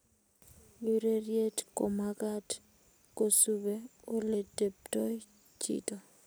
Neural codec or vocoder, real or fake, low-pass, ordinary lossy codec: none; real; none; none